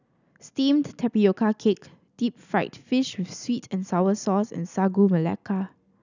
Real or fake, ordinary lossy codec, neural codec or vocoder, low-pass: real; none; none; 7.2 kHz